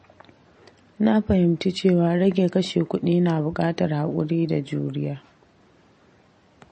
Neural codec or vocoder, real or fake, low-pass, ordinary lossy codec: none; real; 9.9 kHz; MP3, 32 kbps